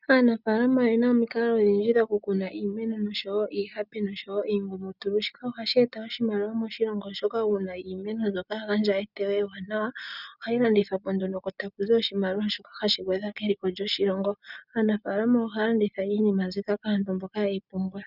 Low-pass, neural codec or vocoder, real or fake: 5.4 kHz; none; real